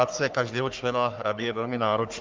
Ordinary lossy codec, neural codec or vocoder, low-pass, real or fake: Opus, 32 kbps; codec, 44.1 kHz, 3.4 kbps, Pupu-Codec; 7.2 kHz; fake